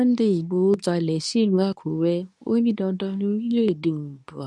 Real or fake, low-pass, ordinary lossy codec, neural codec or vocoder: fake; none; none; codec, 24 kHz, 0.9 kbps, WavTokenizer, medium speech release version 2